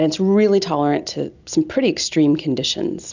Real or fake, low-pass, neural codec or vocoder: real; 7.2 kHz; none